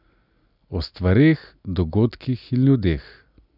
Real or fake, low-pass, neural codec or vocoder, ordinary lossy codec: real; 5.4 kHz; none; none